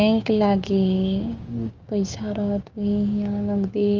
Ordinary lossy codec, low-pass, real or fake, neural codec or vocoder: Opus, 24 kbps; 7.2 kHz; fake; codec, 16 kHz, 6 kbps, DAC